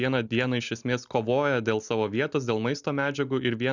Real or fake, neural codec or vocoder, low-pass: real; none; 7.2 kHz